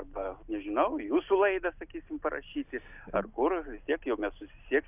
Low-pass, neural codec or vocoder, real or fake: 3.6 kHz; none; real